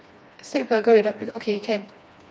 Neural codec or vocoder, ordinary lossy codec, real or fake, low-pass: codec, 16 kHz, 2 kbps, FreqCodec, smaller model; none; fake; none